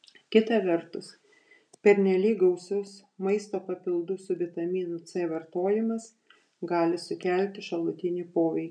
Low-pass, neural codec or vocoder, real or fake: 9.9 kHz; none; real